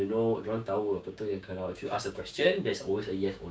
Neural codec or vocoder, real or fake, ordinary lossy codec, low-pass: codec, 16 kHz, 6 kbps, DAC; fake; none; none